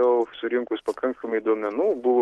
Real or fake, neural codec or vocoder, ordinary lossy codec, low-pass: real; none; Opus, 16 kbps; 14.4 kHz